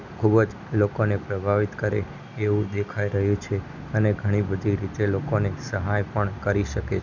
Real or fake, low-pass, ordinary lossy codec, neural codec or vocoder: real; 7.2 kHz; none; none